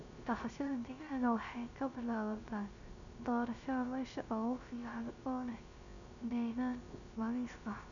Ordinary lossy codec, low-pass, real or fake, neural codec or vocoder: none; 7.2 kHz; fake; codec, 16 kHz, 0.3 kbps, FocalCodec